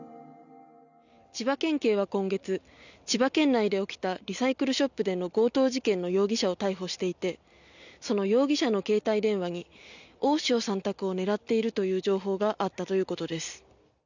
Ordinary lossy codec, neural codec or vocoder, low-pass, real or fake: none; none; 7.2 kHz; real